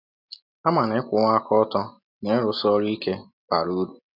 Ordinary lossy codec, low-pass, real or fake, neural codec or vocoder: none; 5.4 kHz; real; none